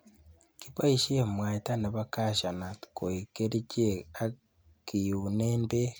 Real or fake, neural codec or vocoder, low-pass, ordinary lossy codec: real; none; none; none